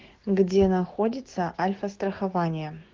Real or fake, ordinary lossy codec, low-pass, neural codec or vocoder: fake; Opus, 16 kbps; 7.2 kHz; codec, 16 kHz, 6 kbps, DAC